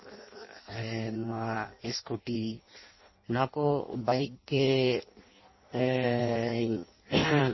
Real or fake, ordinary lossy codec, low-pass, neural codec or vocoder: fake; MP3, 24 kbps; 7.2 kHz; codec, 16 kHz in and 24 kHz out, 0.6 kbps, FireRedTTS-2 codec